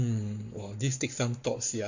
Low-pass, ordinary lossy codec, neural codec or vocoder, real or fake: 7.2 kHz; none; codec, 44.1 kHz, 7.8 kbps, Pupu-Codec; fake